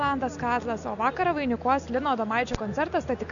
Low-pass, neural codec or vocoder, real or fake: 7.2 kHz; none; real